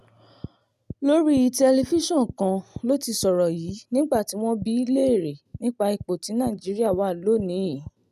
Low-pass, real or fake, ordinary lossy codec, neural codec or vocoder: 14.4 kHz; real; none; none